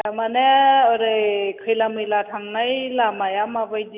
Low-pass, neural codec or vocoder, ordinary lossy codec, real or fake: 3.6 kHz; none; none; real